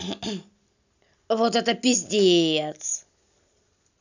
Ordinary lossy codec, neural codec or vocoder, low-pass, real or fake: none; none; 7.2 kHz; real